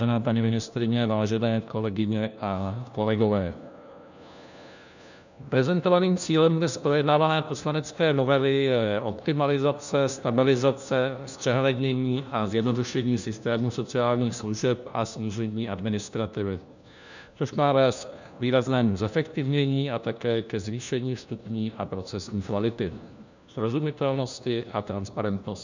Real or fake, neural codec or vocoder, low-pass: fake; codec, 16 kHz, 1 kbps, FunCodec, trained on LibriTTS, 50 frames a second; 7.2 kHz